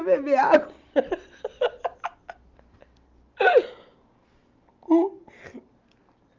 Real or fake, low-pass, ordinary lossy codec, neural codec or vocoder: real; 7.2 kHz; Opus, 24 kbps; none